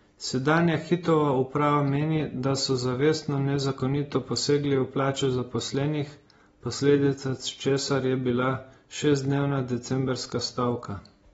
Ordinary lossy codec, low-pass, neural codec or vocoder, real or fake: AAC, 24 kbps; 19.8 kHz; none; real